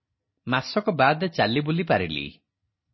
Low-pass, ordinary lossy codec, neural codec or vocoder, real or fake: 7.2 kHz; MP3, 24 kbps; none; real